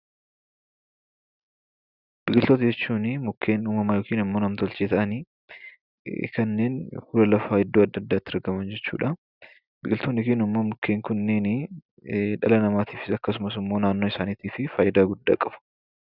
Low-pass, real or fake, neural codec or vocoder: 5.4 kHz; real; none